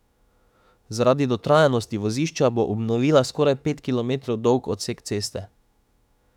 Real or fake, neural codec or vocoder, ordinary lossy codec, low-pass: fake; autoencoder, 48 kHz, 32 numbers a frame, DAC-VAE, trained on Japanese speech; none; 19.8 kHz